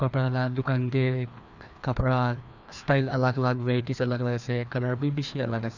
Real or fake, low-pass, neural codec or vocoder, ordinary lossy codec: fake; 7.2 kHz; codec, 16 kHz, 2 kbps, FreqCodec, larger model; none